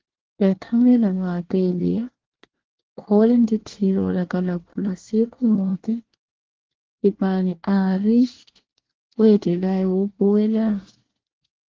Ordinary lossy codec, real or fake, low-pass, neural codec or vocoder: Opus, 16 kbps; fake; 7.2 kHz; codec, 24 kHz, 1 kbps, SNAC